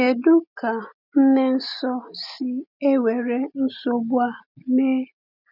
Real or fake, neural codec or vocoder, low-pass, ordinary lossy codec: real; none; 5.4 kHz; none